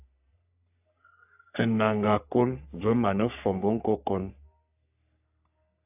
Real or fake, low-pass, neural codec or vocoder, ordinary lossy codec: fake; 3.6 kHz; codec, 44.1 kHz, 3.4 kbps, Pupu-Codec; AAC, 32 kbps